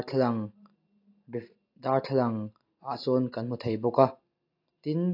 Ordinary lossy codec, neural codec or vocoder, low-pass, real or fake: AAC, 32 kbps; none; 5.4 kHz; real